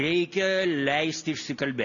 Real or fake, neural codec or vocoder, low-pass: real; none; 7.2 kHz